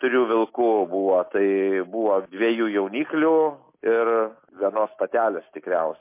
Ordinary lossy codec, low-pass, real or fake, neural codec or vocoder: MP3, 24 kbps; 3.6 kHz; real; none